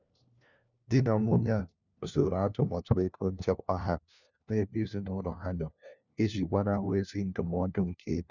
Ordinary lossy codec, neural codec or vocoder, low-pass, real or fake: none; codec, 16 kHz, 1 kbps, FunCodec, trained on LibriTTS, 50 frames a second; 7.2 kHz; fake